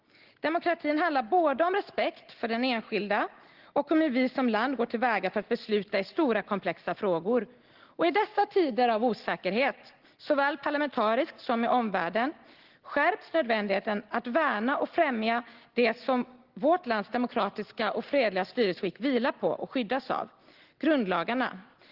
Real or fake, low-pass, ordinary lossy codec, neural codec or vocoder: real; 5.4 kHz; Opus, 16 kbps; none